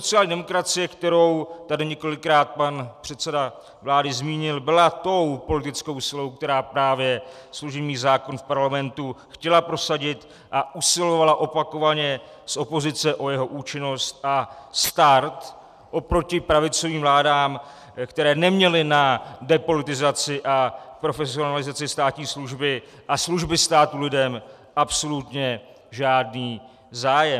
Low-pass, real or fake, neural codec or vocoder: 14.4 kHz; real; none